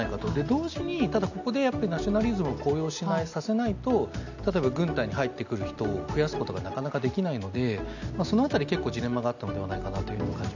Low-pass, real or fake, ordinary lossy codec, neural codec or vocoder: 7.2 kHz; real; none; none